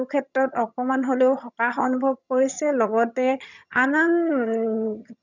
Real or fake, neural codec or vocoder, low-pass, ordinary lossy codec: fake; vocoder, 22.05 kHz, 80 mel bands, HiFi-GAN; 7.2 kHz; none